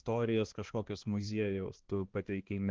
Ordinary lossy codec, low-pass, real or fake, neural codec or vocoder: Opus, 16 kbps; 7.2 kHz; fake; codec, 16 kHz, 2 kbps, X-Codec, HuBERT features, trained on balanced general audio